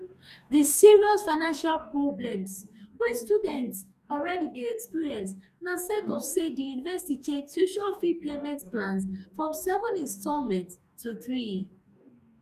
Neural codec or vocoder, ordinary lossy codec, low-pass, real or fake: codec, 44.1 kHz, 2.6 kbps, DAC; none; 14.4 kHz; fake